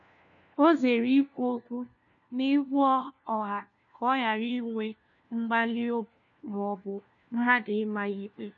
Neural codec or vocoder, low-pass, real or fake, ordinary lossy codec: codec, 16 kHz, 1 kbps, FunCodec, trained on LibriTTS, 50 frames a second; 7.2 kHz; fake; none